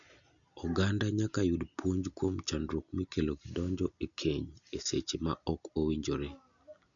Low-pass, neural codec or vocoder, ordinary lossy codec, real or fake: 7.2 kHz; none; none; real